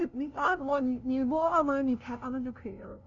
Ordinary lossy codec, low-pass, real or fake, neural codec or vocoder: none; 7.2 kHz; fake; codec, 16 kHz, 0.5 kbps, FunCodec, trained on LibriTTS, 25 frames a second